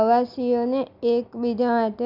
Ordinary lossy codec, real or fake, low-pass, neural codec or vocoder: none; real; 5.4 kHz; none